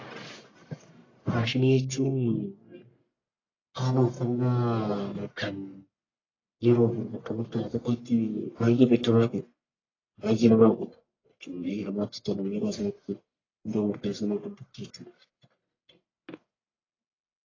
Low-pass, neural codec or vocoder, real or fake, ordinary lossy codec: 7.2 kHz; codec, 44.1 kHz, 1.7 kbps, Pupu-Codec; fake; AAC, 32 kbps